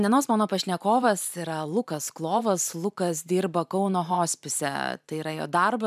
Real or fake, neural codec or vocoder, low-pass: fake; vocoder, 44.1 kHz, 128 mel bands every 512 samples, BigVGAN v2; 14.4 kHz